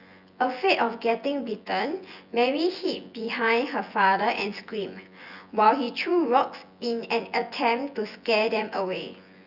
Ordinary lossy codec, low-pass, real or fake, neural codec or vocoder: Opus, 64 kbps; 5.4 kHz; fake; vocoder, 24 kHz, 100 mel bands, Vocos